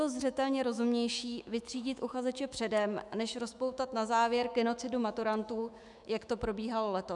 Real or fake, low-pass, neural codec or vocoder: fake; 10.8 kHz; autoencoder, 48 kHz, 128 numbers a frame, DAC-VAE, trained on Japanese speech